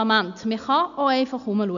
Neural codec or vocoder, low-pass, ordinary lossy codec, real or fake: none; 7.2 kHz; none; real